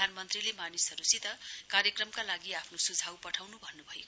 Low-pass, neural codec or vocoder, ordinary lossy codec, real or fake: none; none; none; real